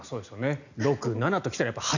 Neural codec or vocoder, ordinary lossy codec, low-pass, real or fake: none; none; 7.2 kHz; real